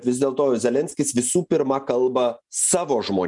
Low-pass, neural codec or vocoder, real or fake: 10.8 kHz; none; real